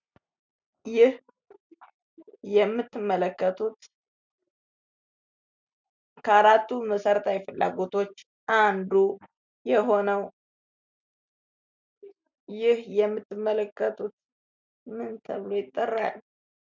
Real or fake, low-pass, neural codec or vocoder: real; 7.2 kHz; none